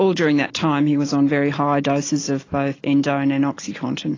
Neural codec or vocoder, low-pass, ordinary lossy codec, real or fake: none; 7.2 kHz; AAC, 32 kbps; real